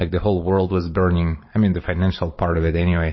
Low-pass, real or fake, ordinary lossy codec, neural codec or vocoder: 7.2 kHz; fake; MP3, 24 kbps; vocoder, 44.1 kHz, 128 mel bands every 256 samples, BigVGAN v2